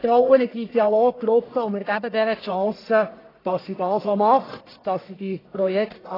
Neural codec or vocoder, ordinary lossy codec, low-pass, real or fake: codec, 44.1 kHz, 1.7 kbps, Pupu-Codec; AAC, 24 kbps; 5.4 kHz; fake